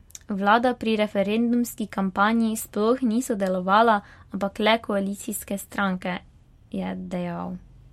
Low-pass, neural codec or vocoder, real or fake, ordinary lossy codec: 19.8 kHz; none; real; MP3, 64 kbps